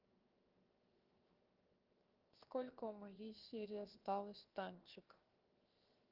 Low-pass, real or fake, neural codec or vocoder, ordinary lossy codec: 5.4 kHz; fake; codec, 16 kHz, 0.7 kbps, FocalCodec; Opus, 32 kbps